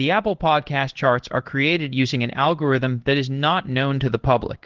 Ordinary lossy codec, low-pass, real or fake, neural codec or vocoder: Opus, 16 kbps; 7.2 kHz; fake; codec, 16 kHz in and 24 kHz out, 1 kbps, XY-Tokenizer